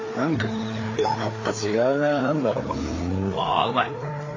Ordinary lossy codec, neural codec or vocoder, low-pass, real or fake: AAC, 32 kbps; codec, 16 kHz, 4 kbps, FreqCodec, larger model; 7.2 kHz; fake